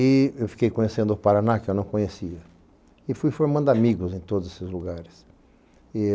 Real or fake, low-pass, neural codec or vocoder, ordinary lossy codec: real; none; none; none